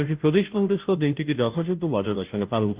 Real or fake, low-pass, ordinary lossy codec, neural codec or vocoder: fake; 3.6 kHz; Opus, 32 kbps; codec, 16 kHz, 0.5 kbps, FunCodec, trained on Chinese and English, 25 frames a second